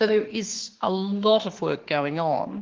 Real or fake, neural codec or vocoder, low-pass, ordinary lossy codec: fake; autoencoder, 48 kHz, 32 numbers a frame, DAC-VAE, trained on Japanese speech; 7.2 kHz; Opus, 16 kbps